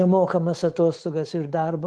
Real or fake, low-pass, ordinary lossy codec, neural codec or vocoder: fake; 10.8 kHz; Opus, 16 kbps; codec, 24 kHz, 3.1 kbps, DualCodec